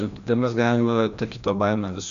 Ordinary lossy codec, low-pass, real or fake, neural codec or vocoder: Opus, 64 kbps; 7.2 kHz; fake; codec, 16 kHz, 1 kbps, FreqCodec, larger model